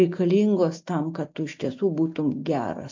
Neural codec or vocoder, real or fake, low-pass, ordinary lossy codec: none; real; 7.2 kHz; MP3, 48 kbps